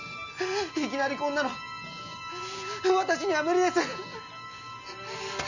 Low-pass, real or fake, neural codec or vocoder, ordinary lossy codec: 7.2 kHz; real; none; none